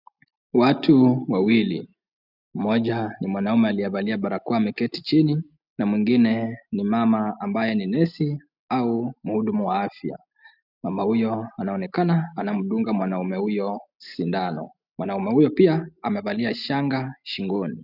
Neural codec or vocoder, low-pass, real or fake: none; 5.4 kHz; real